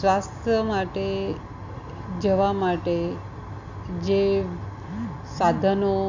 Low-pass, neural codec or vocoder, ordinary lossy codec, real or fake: 7.2 kHz; none; Opus, 64 kbps; real